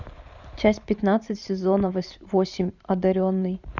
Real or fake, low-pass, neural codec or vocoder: fake; 7.2 kHz; vocoder, 44.1 kHz, 128 mel bands every 512 samples, BigVGAN v2